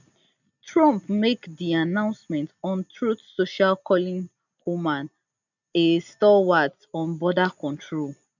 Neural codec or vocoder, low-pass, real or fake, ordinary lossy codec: none; 7.2 kHz; real; none